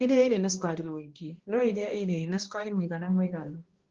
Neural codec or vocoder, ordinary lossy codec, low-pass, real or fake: codec, 16 kHz, 1 kbps, X-Codec, HuBERT features, trained on balanced general audio; Opus, 16 kbps; 7.2 kHz; fake